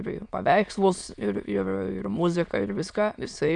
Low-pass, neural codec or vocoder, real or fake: 9.9 kHz; autoencoder, 22.05 kHz, a latent of 192 numbers a frame, VITS, trained on many speakers; fake